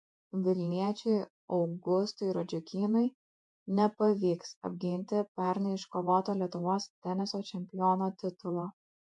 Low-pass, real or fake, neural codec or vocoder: 10.8 kHz; fake; vocoder, 48 kHz, 128 mel bands, Vocos